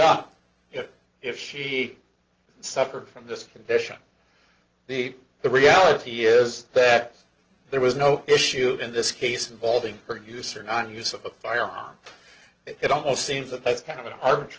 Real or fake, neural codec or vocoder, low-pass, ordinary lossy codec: real; none; 7.2 kHz; Opus, 16 kbps